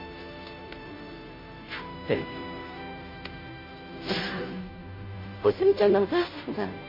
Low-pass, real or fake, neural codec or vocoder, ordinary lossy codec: 5.4 kHz; fake; codec, 16 kHz, 0.5 kbps, FunCodec, trained on Chinese and English, 25 frames a second; MP3, 24 kbps